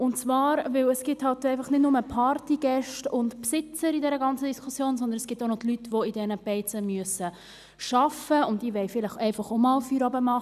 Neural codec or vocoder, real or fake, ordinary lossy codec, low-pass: none; real; none; 14.4 kHz